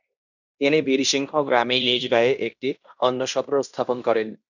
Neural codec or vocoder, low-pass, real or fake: codec, 16 kHz in and 24 kHz out, 0.9 kbps, LongCat-Audio-Codec, fine tuned four codebook decoder; 7.2 kHz; fake